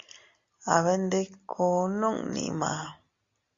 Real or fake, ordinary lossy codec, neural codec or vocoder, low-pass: real; Opus, 64 kbps; none; 7.2 kHz